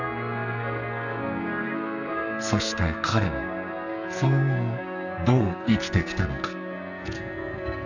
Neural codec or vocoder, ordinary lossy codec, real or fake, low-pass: codec, 44.1 kHz, 2.6 kbps, SNAC; none; fake; 7.2 kHz